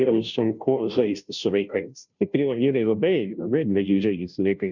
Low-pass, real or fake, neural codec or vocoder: 7.2 kHz; fake; codec, 16 kHz, 0.5 kbps, FunCodec, trained on Chinese and English, 25 frames a second